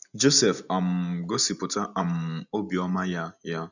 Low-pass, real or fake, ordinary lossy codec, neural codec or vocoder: 7.2 kHz; real; none; none